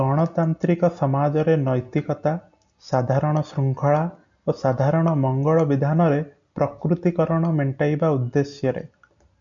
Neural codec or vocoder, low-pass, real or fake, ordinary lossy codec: none; 7.2 kHz; real; MP3, 64 kbps